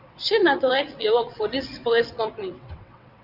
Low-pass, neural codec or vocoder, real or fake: 5.4 kHz; vocoder, 22.05 kHz, 80 mel bands, WaveNeXt; fake